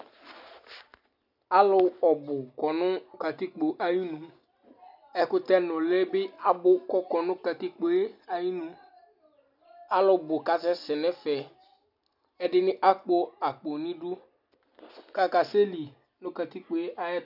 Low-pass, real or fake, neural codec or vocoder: 5.4 kHz; real; none